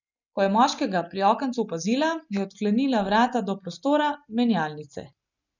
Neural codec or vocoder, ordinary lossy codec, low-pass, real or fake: none; none; 7.2 kHz; real